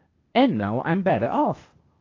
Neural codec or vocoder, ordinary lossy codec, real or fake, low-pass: codec, 16 kHz, 0.8 kbps, ZipCodec; AAC, 32 kbps; fake; 7.2 kHz